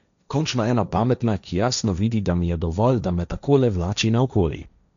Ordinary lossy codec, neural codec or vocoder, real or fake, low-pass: none; codec, 16 kHz, 1.1 kbps, Voila-Tokenizer; fake; 7.2 kHz